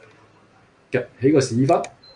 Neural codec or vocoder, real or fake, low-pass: none; real; 9.9 kHz